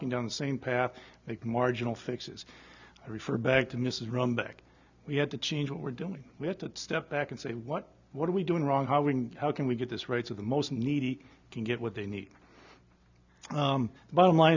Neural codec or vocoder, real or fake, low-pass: none; real; 7.2 kHz